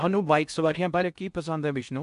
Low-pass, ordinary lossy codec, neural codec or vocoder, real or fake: 10.8 kHz; AAC, 96 kbps; codec, 16 kHz in and 24 kHz out, 0.6 kbps, FocalCodec, streaming, 4096 codes; fake